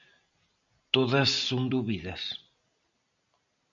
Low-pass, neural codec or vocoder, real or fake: 7.2 kHz; none; real